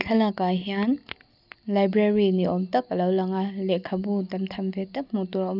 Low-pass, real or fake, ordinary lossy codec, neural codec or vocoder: 5.4 kHz; real; none; none